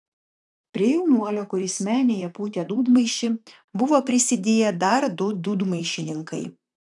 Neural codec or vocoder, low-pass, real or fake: vocoder, 44.1 kHz, 128 mel bands, Pupu-Vocoder; 10.8 kHz; fake